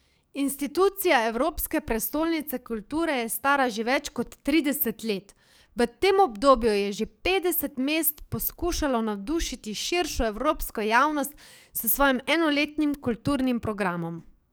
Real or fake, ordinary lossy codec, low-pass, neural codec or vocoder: fake; none; none; codec, 44.1 kHz, 7.8 kbps, DAC